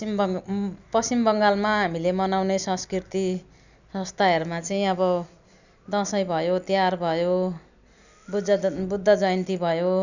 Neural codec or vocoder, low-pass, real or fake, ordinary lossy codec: none; 7.2 kHz; real; none